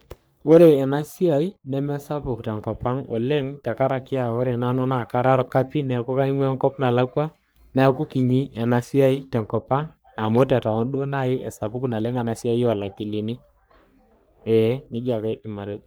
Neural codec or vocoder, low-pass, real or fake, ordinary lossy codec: codec, 44.1 kHz, 3.4 kbps, Pupu-Codec; none; fake; none